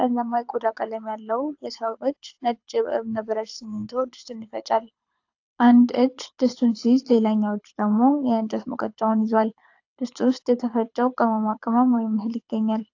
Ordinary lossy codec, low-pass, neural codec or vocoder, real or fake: AAC, 48 kbps; 7.2 kHz; codec, 24 kHz, 6 kbps, HILCodec; fake